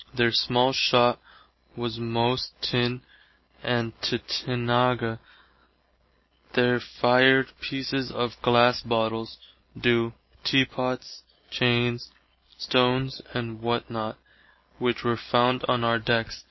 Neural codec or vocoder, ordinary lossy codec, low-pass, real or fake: none; MP3, 24 kbps; 7.2 kHz; real